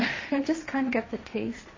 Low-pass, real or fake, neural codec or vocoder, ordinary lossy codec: 7.2 kHz; fake; codec, 16 kHz, 1.1 kbps, Voila-Tokenizer; MP3, 32 kbps